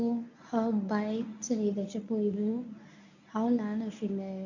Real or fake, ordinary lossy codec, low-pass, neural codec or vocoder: fake; none; 7.2 kHz; codec, 24 kHz, 0.9 kbps, WavTokenizer, medium speech release version 1